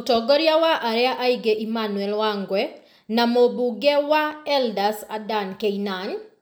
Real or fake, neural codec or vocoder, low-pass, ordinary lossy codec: real; none; none; none